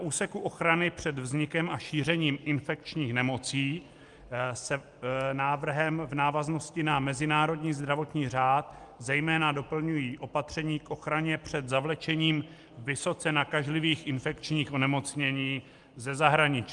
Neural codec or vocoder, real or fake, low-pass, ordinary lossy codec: none; real; 10.8 kHz; Opus, 32 kbps